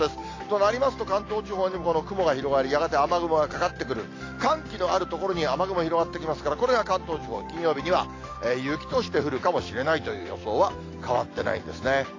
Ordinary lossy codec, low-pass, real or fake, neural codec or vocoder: AAC, 32 kbps; 7.2 kHz; real; none